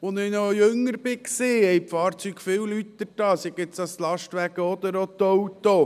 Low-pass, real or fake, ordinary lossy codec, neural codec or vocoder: 14.4 kHz; real; none; none